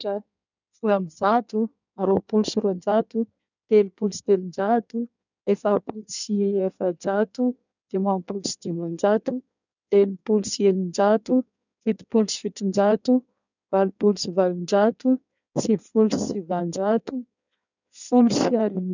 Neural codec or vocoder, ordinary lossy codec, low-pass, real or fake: codec, 16 kHz in and 24 kHz out, 1.1 kbps, FireRedTTS-2 codec; none; 7.2 kHz; fake